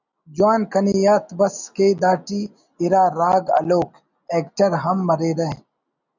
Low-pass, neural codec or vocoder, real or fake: 7.2 kHz; none; real